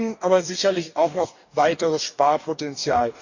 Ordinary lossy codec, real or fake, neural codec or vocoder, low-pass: none; fake; codec, 44.1 kHz, 2.6 kbps, DAC; 7.2 kHz